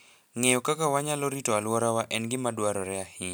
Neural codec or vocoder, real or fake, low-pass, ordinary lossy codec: none; real; none; none